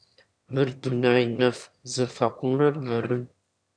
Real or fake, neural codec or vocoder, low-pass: fake; autoencoder, 22.05 kHz, a latent of 192 numbers a frame, VITS, trained on one speaker; 9.9 kHz